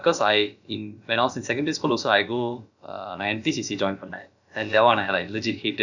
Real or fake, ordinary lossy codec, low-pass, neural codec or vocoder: fake; none; 7.2 kHz; codec, 16 kHz, about 1 kbps, DyCAST, with the encoder's durations